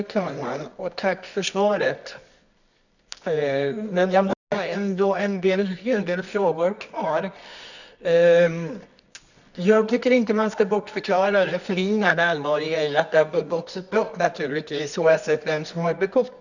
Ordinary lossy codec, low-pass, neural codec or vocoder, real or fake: none; 7.2 kHz; codec, 24 kHz, 0.9 kbps, WavTokenizer, medium music audio release; fake